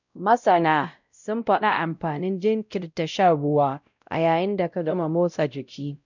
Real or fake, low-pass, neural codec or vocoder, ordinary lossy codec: fake; 7.2 kHz; codec, 16 kHz, 0.5 kbps, X-Codec, WavLM features, trained on Multilingual LibriSpeech; none